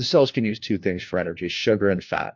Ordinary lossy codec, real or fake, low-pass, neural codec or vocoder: MP3, 48 kbps; fake; 7.2 kHz; codec, 16 kHz, 1 kbps, FunCodec, trained on LibriTTS, 50 frames a second